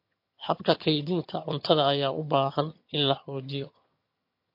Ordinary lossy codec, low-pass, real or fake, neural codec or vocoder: MP3, 32 kbps; 5.4 kHz; fake; vocoder, 22.05 kHz, 80 mel bands, HiFi-GAN